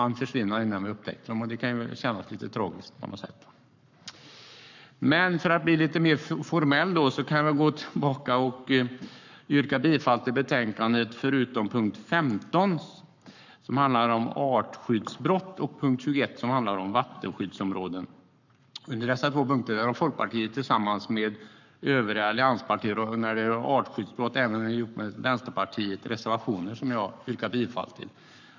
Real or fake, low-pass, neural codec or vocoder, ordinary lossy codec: fake; 7.2 kHz; codec, 44.1 kHz, 7.8 kbps, Pupu-Codec; none